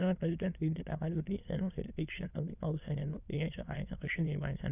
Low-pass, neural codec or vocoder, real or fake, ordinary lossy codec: 3.6 kHz; autoencoder, 22.05 kHz, a latent of 192 numbers a frame, VITS, trained on many speakers; fake; none